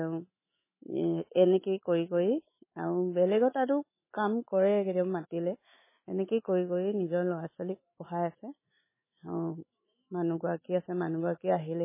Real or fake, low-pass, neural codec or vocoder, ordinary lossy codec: fake; 3.6 kHz; autoencoder, 48 kHz, 128 numbers a frame, DAC-VAE, trained on Japanese speech; MP3, 16 kbps